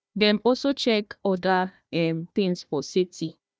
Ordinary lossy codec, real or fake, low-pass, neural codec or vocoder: none; fake; none; codec, 16 kHz, 1 kbps, FunCodec, trained on Chinese and English, 50 frames a second